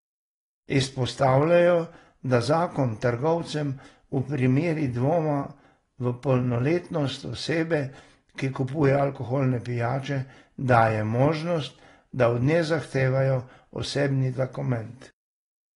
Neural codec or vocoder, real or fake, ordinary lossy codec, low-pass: none; real; AAC, 32 kbps; 10.8 kHz